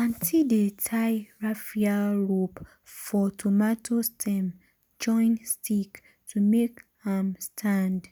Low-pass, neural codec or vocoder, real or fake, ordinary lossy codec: none; none; real; none